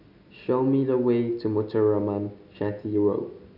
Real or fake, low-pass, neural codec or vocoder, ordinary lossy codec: real; 5.4 kHz; none; none